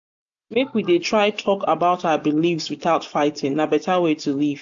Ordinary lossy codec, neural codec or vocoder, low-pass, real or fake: AAC, 64 kbps; none; 7.2 kHz; real